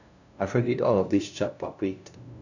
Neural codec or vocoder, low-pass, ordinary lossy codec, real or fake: codec, 16 kHz, 0.5 kbps, FunCodec, trained on LibriTTS, 25 frames a second; 7.2 kHz; none; fake